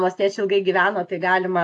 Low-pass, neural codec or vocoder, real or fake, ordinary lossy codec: 10.8 kHz; none; real; AAC, 48 kbps